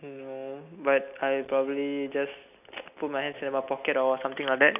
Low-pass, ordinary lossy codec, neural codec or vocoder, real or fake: 3.6 kHz; none; none; real